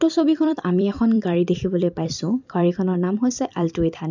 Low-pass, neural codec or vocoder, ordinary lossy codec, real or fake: 7.2 kHz; vocoder, 44.1 kHz, 128 mel bands every 256 samples, BigVGAN v2; none; fake